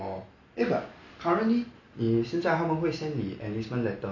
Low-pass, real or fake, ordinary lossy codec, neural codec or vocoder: 7.2 kHz; real; none; none